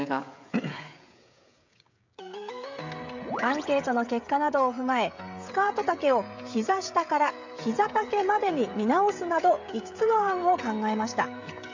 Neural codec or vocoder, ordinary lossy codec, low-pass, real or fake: codec, 16 kHz, 16 kbps, FreqCodec, smaller model; MP3, 64 kbps; 7.2 kHz; fake